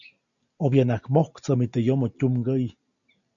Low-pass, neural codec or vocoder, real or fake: 7.2 kHz; none; real